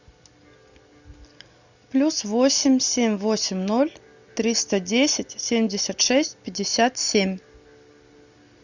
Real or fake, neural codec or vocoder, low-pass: real; none; 7.2 kHz